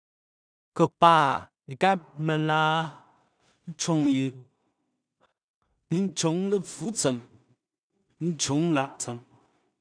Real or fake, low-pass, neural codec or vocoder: fake; 9.9 kHz; codec, 16 kHz in and 24 kHz out, 0.4 kbps, LongCat-Audio-Codec, two codebook decoder